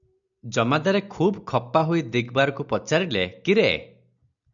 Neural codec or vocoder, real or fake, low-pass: none; real; 7.2 kHz